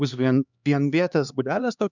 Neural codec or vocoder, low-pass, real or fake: codec, 16 kHz, 2 kbps, X-Codec, HuBERT features, trained on LibriSpeech; 7.2 kHz; fake